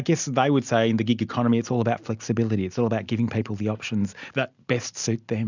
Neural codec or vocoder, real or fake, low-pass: none; real; 7.2 kHz